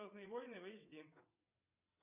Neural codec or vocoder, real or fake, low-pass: vocoder, 22.05 kHz, 80 mel bands, WaveNeXt; fake; 3.6 kHz